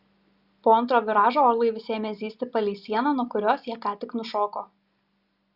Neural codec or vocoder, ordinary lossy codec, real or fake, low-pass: none; Opus, 64 kbps; real; 5.4 kHz